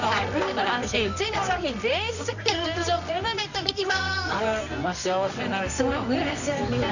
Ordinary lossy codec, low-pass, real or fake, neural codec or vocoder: none; 7.2 kHz; fake; codec, 24 kHz, 0.9 kbps, WavTokenizer, medium music audio release